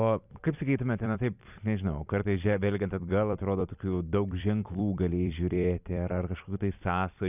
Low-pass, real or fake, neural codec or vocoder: 3.6 kHz; fake; vocoder, 44.1 kHz, 128 mel bands every 256 samples, BigVGAN v2